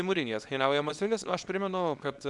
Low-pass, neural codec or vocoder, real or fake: 10.8 kHz; codec, 24 kHz, 0.9 kbps, WavTokenizer, small release; fake